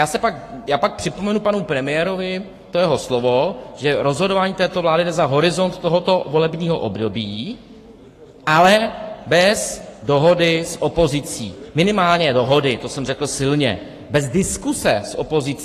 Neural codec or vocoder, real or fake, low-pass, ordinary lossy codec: codec, 44.1 kHz, 7.8 kbps, Pupu-Codec; fake; 14.4 kHz; AAC, 48 kbps